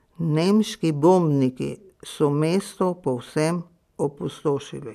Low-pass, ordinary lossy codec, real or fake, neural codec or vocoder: 14.4 kHz; MP3, 96 kbps; real; none